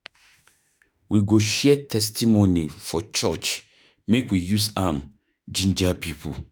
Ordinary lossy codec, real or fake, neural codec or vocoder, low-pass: none; fake; autoencoder, 48 kHz, 32 numbers a frame, DAC-VAE, trained on Japanese speech; none